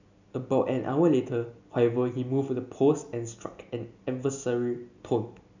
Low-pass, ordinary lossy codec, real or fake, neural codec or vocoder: 7.2 kHz; none; real; none